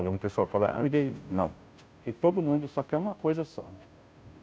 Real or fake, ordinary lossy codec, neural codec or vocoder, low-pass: fake; none; codec, 16 kHz, 0.5 kbps, FunCodec, trained on Chinese and English, 25 frames a second; none